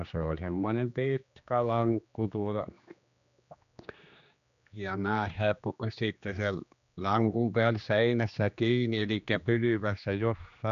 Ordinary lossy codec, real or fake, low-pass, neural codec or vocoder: none; fake; 7.2 kHz; codec, 16 kHz, 2 kbps, X-Codec, HuBERT features, trained on general audio